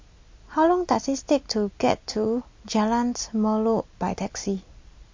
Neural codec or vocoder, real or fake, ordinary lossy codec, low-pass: none; real; MP3, 48 kbps; 7.2 kHz